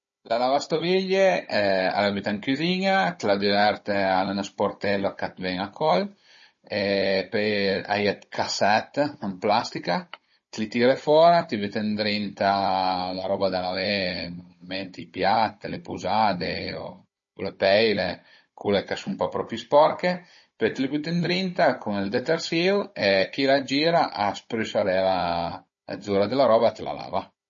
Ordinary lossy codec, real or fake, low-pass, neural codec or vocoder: MP3, 32 kbps; fake; 7.2 kHz; codec, 16 kHz, 16 kbps, FunCodec, trained on Chinese and English, 50 frames a second